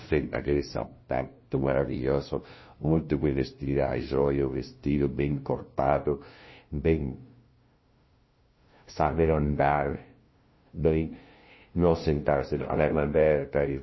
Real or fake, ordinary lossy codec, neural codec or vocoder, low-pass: fake; MP3, 24 kbps; codec, 16 kHz, 0.5 kbps, FunCodec, trained on LibriTTS, 25 frames a second; 7.2 kHz